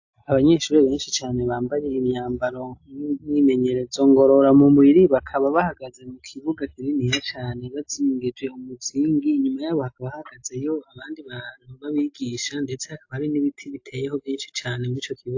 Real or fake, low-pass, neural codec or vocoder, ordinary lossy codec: real; 7.2 kHz; none; AAC, 48 kbps